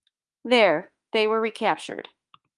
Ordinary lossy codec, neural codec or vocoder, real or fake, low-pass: Opus, 32 kbps; autoencoder, 48 kHz, 32 numbers a frame, DAC-VAE, trained on Japanese speech; fake; 10.8 kHz